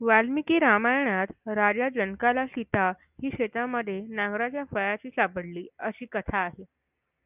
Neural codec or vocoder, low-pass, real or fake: none; 3.6 kHz; real